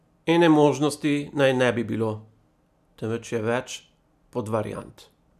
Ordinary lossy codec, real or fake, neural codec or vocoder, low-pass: none; real; none; 14.4 kHz